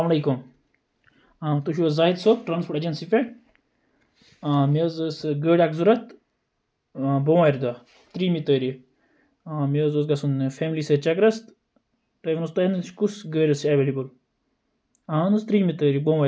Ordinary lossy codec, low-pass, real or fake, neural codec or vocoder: none; none; real; none